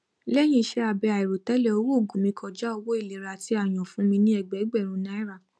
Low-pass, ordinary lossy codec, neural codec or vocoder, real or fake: none; none; none; real